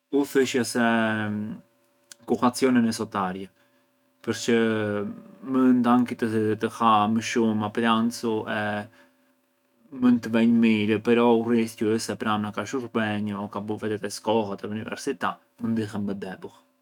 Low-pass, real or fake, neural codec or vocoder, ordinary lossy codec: 19.8 kHz; fake; autoencoder, 48 kHz, 128 numbers a frame, DAC-VAE, trained on Japanese speech; none